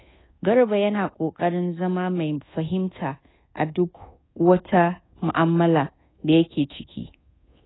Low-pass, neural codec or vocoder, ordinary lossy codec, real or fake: 7.2 kHz; codec, 24 kHz, 1.2 kbps, DualCodec; AAC, 16 kbps; fake